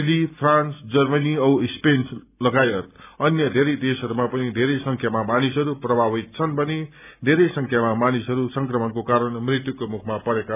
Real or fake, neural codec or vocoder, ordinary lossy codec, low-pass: real; none; none; 3.6 kHz